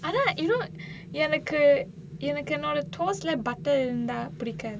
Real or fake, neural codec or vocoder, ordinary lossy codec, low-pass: real; none; none; none